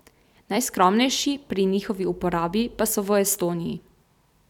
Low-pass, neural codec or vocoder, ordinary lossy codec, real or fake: 19.8 kHz; vocoder, 44.1 kHz, 128 mel bands every 256 samples, BigVGAN v2; none; fake